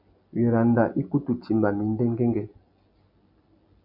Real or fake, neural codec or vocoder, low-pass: real; none; 5.4 kHz